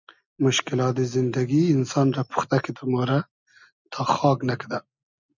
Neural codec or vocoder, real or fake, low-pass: none; real; 7.2 kHz